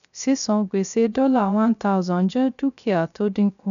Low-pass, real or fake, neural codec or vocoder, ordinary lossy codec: 7.2 kHz; fake; codec, 16 kHz, 0.3 kbps, FocalCodec; none